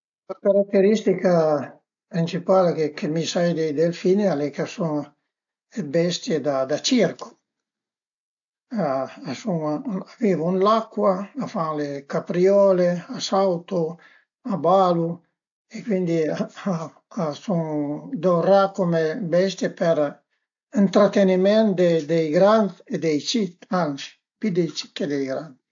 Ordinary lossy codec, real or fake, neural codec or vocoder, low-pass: AAC, 64 kbps; real; none; 7.2 kHz